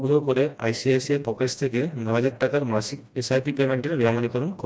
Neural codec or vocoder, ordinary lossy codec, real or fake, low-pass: codec, 16 kHz, 1 kbps, FreqCodec, smaller model; none; fake; none